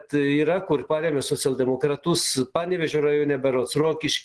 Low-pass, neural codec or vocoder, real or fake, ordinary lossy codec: 10.8 kHz; none; real; Opus, 16 kbps